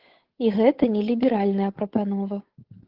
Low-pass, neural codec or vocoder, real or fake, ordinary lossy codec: 5.4 kHz; codec, 16 kHz, 8 kbps, FunCodec, trained on Chinese and English, 25 frames a second; fake; Opus, 16 kbps